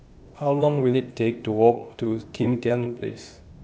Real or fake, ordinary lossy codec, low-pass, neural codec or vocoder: fake; none; none; codec, 16 kHz, 0.8 kbps, ZipCodec